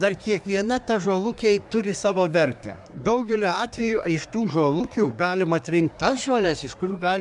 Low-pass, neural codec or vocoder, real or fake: 10.8 kHz; codec, 24 kHz, 1 kbps, SNAC; fake